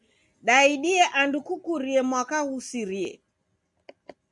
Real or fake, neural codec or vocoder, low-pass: real; none; 10.8 kHz